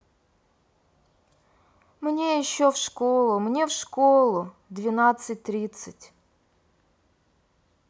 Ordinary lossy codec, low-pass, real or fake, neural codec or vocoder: none; none; real; none